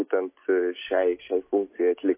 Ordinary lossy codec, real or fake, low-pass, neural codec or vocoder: MP3, 24 kbps; real; 3.6 kHz; none